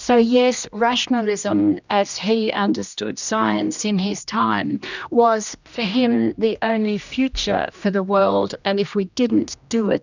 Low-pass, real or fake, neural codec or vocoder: 7.2 kHz; fake; codec, 16 kHz, 1 kbps, X-Codec, HuBERT features, trained on general audio